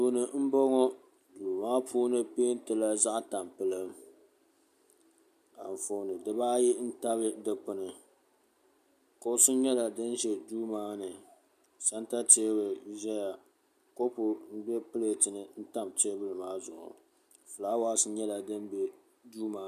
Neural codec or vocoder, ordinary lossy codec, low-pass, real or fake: none; MP3, 96 kbps; 14.4 kHz; real